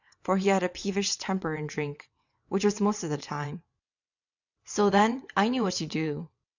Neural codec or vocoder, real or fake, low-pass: vocoder, 22.05 kHz, 80 mel bands, WaveNeXt; fake; 7.2 kHz